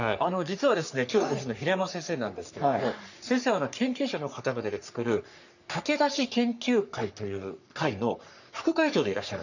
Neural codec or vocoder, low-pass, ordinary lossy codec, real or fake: codec, 44.1 kHz, 3.4 kbps, Pupu-Codec; 7.2 kHz; none; fake